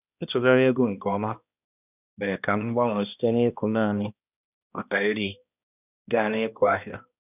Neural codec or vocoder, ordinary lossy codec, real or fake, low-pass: codec, 16 kHz, 1 kbps, X-Codec, HuBERT features, trained on balanced general audio; none; fake; 3.6 kHz